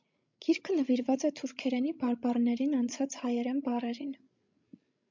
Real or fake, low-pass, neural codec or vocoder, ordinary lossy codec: fake; 7.2 kHz; codec, 16 kHz, 16 kbps, FreqCodec, larger model; MP3, 64 kbps